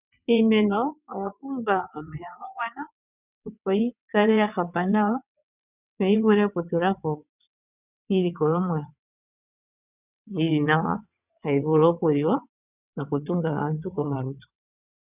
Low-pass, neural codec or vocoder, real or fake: 3.6 kHz; vocoder, 22.05 kHz, 80 mel bands, WaveNeXt; fake